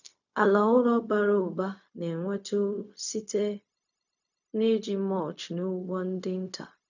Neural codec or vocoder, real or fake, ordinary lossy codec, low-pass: codec, 16 kHz, 0.4 kbps, LongCat-Audio-Codec; fake; none; 7.2 kHz